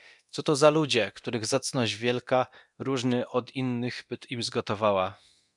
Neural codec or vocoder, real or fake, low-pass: codec, 24 kHz, 0.9 kbps, DualCodec; fake; 10.8 kHz